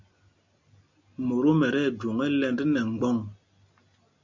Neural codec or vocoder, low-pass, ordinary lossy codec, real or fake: none; 7.2 kHz; MP3, 64 kbps; real